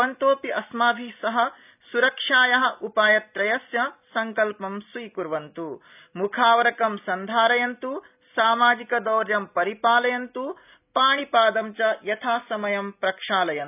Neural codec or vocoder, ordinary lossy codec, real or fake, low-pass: none; none; real; 3.6 kHz